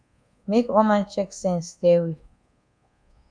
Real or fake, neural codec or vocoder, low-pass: fake; codec, 24 kHz, 1.2 kbps, DualCodec; 9.9 kHz